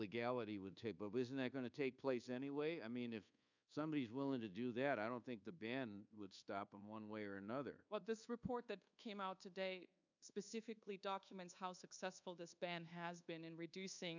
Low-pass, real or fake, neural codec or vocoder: 7.2 kHz; fake; codec, 24 kHz, 1.2 kbps, DualCodec